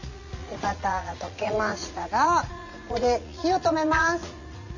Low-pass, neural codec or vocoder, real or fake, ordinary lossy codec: 7.2 kHz; vocoder, 44.1 kHz, 80 mel bands, Vocos; fake; none